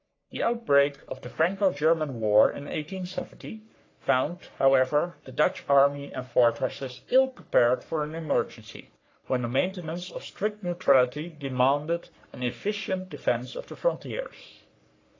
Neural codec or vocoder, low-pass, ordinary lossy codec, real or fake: codec, 44.1 kHz, 3.4 kbps, Pupu-Codec; 7.2 kHz; AAC, 32 kbps; fake